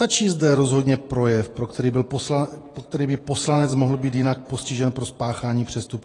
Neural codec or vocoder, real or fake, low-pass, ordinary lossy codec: vocoder, 48 kHz, 128 mel bands, Vocos; fake; 10.8 kHz; AAC, 32 kbps